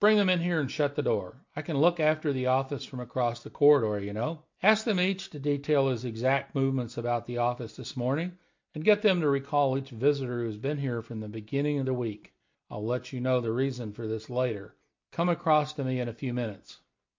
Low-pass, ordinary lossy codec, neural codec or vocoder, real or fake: 7.2 kHz; MP3, 48 kbps; none; real